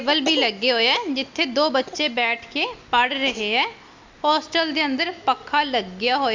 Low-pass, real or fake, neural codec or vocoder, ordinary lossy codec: 7.2 kHz; real; none; MP3, 64 kbps